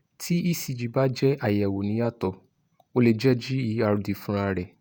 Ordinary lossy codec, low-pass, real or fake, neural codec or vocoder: none; none; fake; vocoder, 48 kHz, 128 mel bands, Vocos